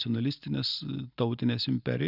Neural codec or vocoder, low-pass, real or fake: vocoder, 44.1 kHz, 128 mel bands every 512 samples, BigVGAN v2; 5.4 kHz; fake